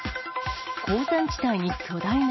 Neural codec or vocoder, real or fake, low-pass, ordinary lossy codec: none; real; 7.2 kHz; MP3, 24 kbps